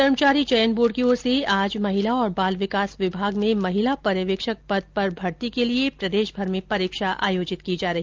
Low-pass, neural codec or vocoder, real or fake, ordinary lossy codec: 7.2 kHz; none; real; Opus, 32 kbps